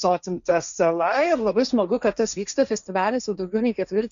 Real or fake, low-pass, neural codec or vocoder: fake; 7.2 kHz; codec, 16 kHz, 1.1 kbps, Voila-Tokenizer